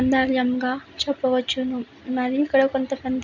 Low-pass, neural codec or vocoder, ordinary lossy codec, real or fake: 7.2 kHz; none; none; real